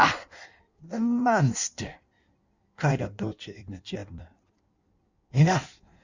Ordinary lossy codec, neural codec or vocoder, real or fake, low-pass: Opus, 64 kbps; codec, 16 kHz in and 24 kHz out, 1.1 kbps, FireRedTTS-2 codec; fake; 7.2 kHz